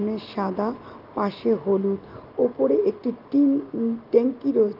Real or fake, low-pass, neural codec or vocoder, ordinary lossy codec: real; 5.4 kHz; none; Opus, 32 kbps